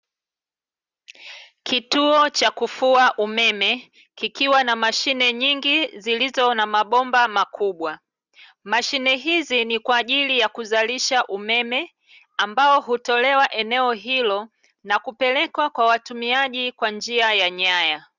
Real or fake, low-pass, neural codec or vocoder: real; 7.2 kHz; none